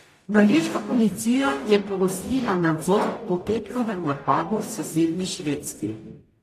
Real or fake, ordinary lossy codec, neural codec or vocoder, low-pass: fake; AAC, 48 kbps; codec, 44.1 kHz, 0.9 kbps, DAC; 14.4 kHz